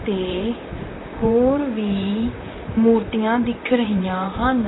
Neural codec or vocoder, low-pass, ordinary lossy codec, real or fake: vocoder, 44.1 kHz, 128 mel bands, Pupu-Vocoder; 7.2 kHz; AAC, 16 kbps; fake